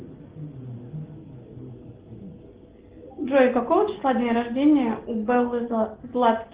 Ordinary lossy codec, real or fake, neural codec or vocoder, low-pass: Opus, 16 kbps; real; none; 3.6 kHz